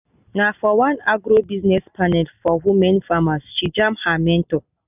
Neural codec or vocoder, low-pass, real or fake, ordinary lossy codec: none; 3.6 kHz; real; none